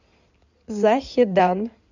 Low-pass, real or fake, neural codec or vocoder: 7.2 kHz; fake; codec, 16 kHz in and 24 kHz out, 2.2 kbps, FireRedTTS-2 codec